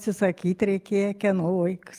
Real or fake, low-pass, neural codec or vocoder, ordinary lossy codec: real; 14.4 kHz; none; Opus, 32 kbps